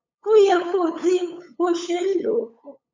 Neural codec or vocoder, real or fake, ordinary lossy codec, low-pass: codec, 16 kHz, 8 kbps, FunCodec, trained on LibriTTS, 25 frames a second; fake; MP3, 64 kbps; 7.2 kHz